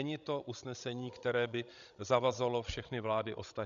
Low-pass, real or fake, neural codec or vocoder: 7.2 kHz; fake; codec, 16 kHz, 16 kbps, FreqCodec, larger model